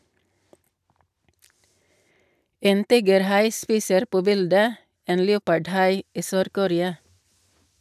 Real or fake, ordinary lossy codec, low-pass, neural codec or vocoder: real; none; 14.4 kHz; none